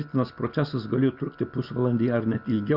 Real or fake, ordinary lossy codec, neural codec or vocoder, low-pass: real; Opus, 64 kbps; none; 5.4 kHz